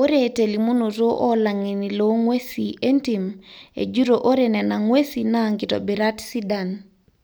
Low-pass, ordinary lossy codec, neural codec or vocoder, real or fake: none; none; none; real